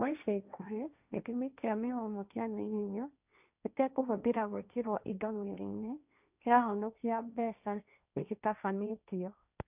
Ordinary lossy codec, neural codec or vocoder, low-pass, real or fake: none; codec, 16 kHz, 1.1 kbps, Voila-Tokenizer; 3.6 kHz; fake